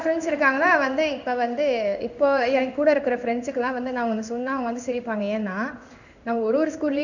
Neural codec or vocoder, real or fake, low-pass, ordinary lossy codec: codec, 16 kHz in and 24 kHz out, 1 kbps, XY-Tokenizer; fake; 7.2 kHz; none